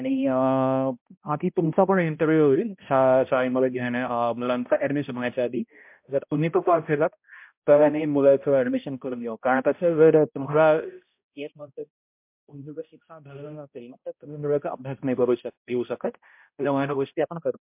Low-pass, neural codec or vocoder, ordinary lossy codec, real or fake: 3.6 kHz; codec, 16 kHz, 0.5 kbps, X-Codec, HuBERT features, trained on balanced general audio; MP3, 32 kbps; fake